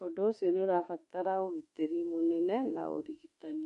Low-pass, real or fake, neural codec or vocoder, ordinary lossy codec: 14.4 kHz; fake; autoencoder, 48 kHz, 32 numbers a frame, DAC-VAE, trained on Japanese speech; MP3, 48 kbps